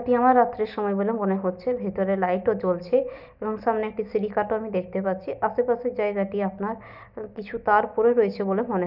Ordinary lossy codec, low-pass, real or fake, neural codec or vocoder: none; 5.4 kHz; real; none